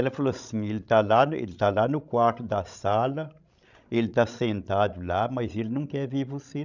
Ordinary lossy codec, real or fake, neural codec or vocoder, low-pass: none; fake; codec, 16 kHz, 16 kbps, FreqCodec, larger model; 7.2 kHz